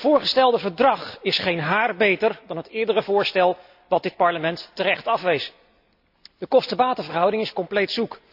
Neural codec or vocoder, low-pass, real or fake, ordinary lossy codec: none; 5.4 kHz; real; AAC, 48 kbps